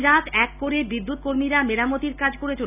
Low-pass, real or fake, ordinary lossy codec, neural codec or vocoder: 3.6 kHz; real; none; none